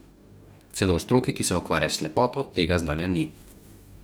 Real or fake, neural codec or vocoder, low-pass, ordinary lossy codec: fake; codec, 44.1 kHz, 2.6 kbps, DAC; none; none